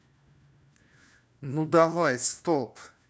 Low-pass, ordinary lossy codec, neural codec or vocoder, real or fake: none; none; codec, 16 kHz, 1 kbps, FunCodec, trained on LibriTTS, 50 frames a second; fake